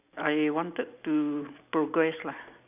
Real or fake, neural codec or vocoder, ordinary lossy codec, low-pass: real; none; none; 3.6 kHz